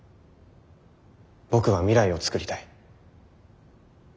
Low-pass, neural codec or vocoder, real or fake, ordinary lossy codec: none; none; real; none